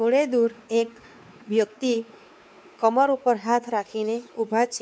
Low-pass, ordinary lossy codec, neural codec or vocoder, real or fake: none; none; codec, 16 kHz, 4 kbps, X-Codec, WavLM features, trained on Multilingual LibriSpeech; fake